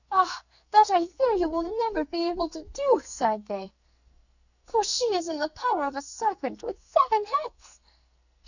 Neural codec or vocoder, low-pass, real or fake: codec, 44.1 kHz, 2.6 kbps, SNAC; 7.2 kHz; fake